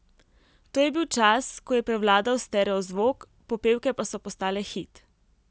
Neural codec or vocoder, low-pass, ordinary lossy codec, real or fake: none; none; none; real